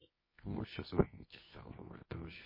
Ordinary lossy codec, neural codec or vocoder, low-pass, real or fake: MP3, 24 kbps; codec, 24 kHz, 0.9 kbps, WavTokenizer, medium music audio release; 5.4 kHz; fake